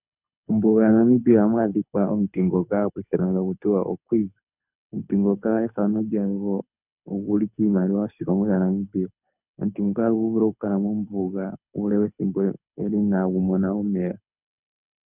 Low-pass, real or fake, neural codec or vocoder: 3.6 kHz; fake; codec, 24 kHz, 6 kbps, HILCodec